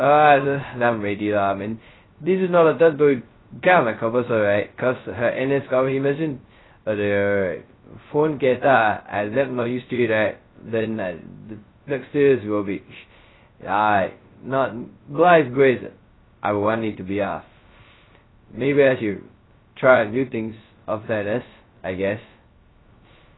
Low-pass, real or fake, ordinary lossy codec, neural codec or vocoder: 7.2 kHz; fake; AAC, 16 kbps; codec, 16 kHz, 0.2 kbps, FocalCodec